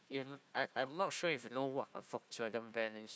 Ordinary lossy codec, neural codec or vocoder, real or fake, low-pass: none; codec, 16 kHz, 1 kbps, FunCodec, trained on Chinese and English, 50 frames a second; fake; none